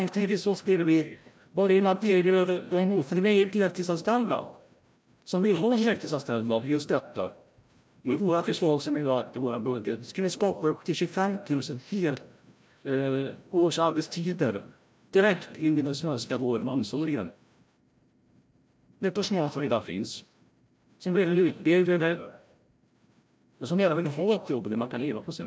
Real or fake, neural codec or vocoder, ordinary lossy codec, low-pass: fake; codec, 16 kHz, 0.5 kbps, FreqCodec, larger model; none; none